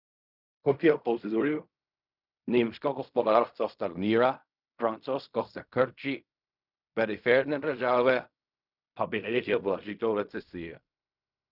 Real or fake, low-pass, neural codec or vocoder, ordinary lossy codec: fake; 5.4 kHz; codec, 16 kHz in and 24 kHz out, 0.4 kbps, LongCat-Audio-Codec, fine tuned four codebook decoder; none